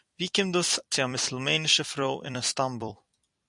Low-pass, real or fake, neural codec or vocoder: 10.8 kHz; fake; vocoder, 44.1 kHz, 128 mel bands every 256 samples, BigVGAN v2